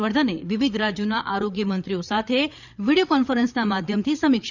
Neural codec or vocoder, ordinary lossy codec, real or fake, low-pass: codec, 16 kHz, 8 kbps, FreqCodec, larger model; none; fake; 7.2 kHz